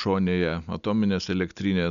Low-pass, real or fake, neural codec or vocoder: 7.2 kHz; real; none